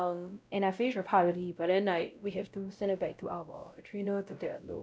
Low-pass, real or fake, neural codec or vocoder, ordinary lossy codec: none; fake; codec, 16 kHz, 0.5 kbps, X-Codec, WavLM features, trained on Multilingual LibriSpeech; none